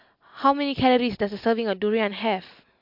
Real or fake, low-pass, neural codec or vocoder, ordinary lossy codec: real; 5.4 kHz; none; MP3, 48 kbps